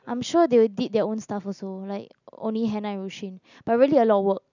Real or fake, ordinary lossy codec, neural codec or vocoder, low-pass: real; none; none; 7.2 kHz